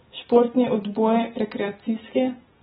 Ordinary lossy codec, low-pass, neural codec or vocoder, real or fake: AAC, 16 kbps; 7.2 kHz; none; real